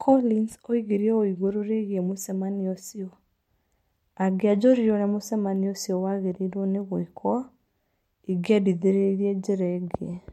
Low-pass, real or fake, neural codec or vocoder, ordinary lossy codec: 19.8 kHz; real; none; MP3, 64 kbps